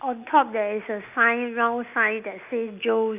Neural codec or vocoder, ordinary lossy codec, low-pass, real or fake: none; none; 3.6 kHz; real